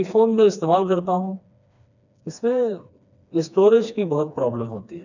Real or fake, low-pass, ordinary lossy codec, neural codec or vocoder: fake; 7.2 kHz; none; codec, 16 kHz, 2 kbps, FreqCodec, smaller model